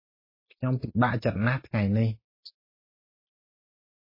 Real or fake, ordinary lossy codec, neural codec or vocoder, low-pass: real; MP3, 24 kbps; none; 5.4 kHz